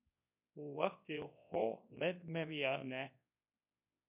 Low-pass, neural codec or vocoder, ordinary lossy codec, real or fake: 3.6 kHz; codec, 24 kHz, 0.9 kbps, WavTokenizer, large speech release; MP3, 32 kbps; fake